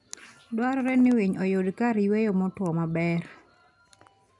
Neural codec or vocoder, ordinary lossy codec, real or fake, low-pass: none; none; real; 10.8 kHz